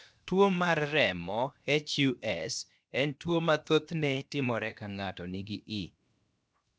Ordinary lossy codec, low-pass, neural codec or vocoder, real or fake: none; none; codec, 16 kHz, 0.7 kbps, FocalCodec; fake